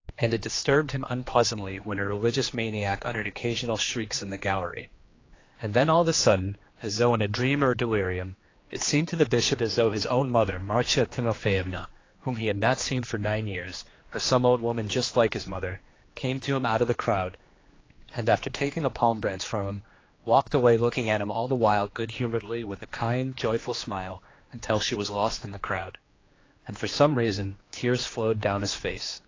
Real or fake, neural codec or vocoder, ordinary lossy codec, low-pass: fake; codec, 16 kHz, 2 kbps, X-Codec, HuBERT features, trained on general audio; AAC, 32 kbps; 7.2 kHz